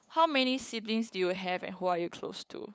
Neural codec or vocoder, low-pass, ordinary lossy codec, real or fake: codec, 16 kHz, 8 kbps, FunCodec, trained on LibriTTS, 25 frames a second; none; none; fake